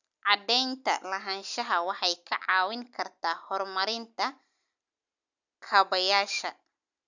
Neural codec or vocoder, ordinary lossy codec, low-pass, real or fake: none; none; 7.2 kHz; real